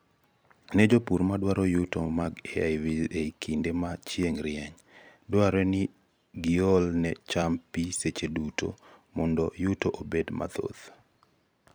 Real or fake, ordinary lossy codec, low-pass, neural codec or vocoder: fake; none; none; vocoder, 44.1 kHz, 128 mel bands every 256 samples, BigVGAN v2